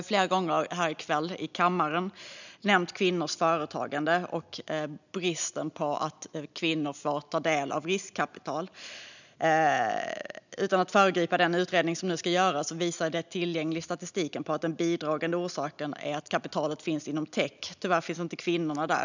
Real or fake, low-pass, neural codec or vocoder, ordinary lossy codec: real; 7.2 kHz; none; none